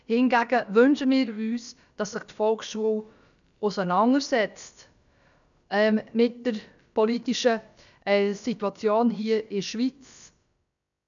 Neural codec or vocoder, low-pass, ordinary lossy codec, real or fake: codec, 16 kHz, about 1 kbps, DyCAST, with the encoder's durations; 7.2 kHz; none; fake